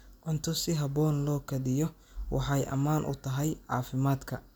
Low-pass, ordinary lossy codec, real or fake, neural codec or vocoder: none; none; real; none